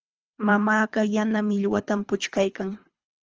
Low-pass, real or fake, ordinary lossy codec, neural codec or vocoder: 7.2 kHz; fake; Opus, 24 kbps; codec, 24 kHz, 3 kbps, HILCodec